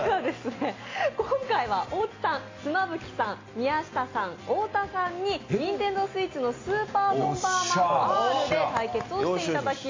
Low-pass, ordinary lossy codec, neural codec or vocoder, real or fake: 7.2 kHz; AAC, 32 kbps; none; real